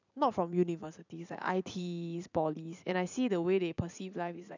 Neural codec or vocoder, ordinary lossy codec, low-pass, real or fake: vocoder, 44.1 kHz, 80 mel bands, Vocos; none; 7.2 kHz; fake